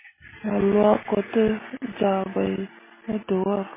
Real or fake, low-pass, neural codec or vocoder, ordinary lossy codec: real; 3.6 kHz; none; MP3, 16 kbps